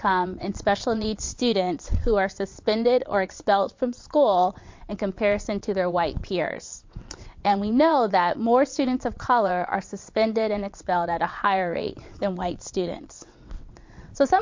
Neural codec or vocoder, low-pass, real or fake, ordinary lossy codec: vocoder, 22.05 kHz, 80 mel bands, WaveNeXt; 7.2 kHz; fake; MP3, 48 kbps